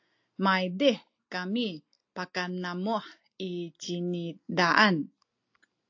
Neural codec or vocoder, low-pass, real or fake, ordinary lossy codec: none; 7.2 kHz; real; AAC, 48 kbps